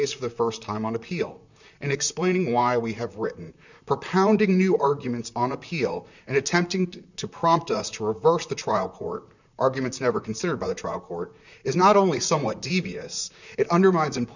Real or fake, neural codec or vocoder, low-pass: fake; vocoder, 44.1 kHz, 128 mel bands, Pupu-Vocoder; 7.2 kHz